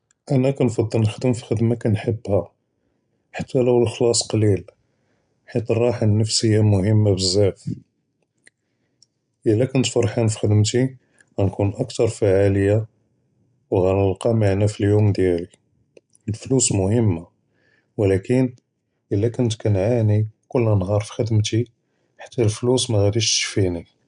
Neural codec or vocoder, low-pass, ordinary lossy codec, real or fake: none; 9.9 kHz; none; real